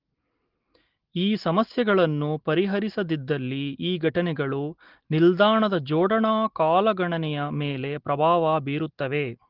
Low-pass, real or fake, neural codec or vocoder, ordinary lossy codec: 5.4 kHz; real; none; Opus, 16 kbps